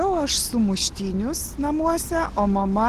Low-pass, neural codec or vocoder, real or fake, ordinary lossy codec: 14.4 kHz; none; real; Opus, 16 kbps